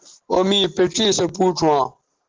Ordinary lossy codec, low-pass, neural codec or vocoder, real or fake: Opus, 16 kbps; 7.2 kHz; none; real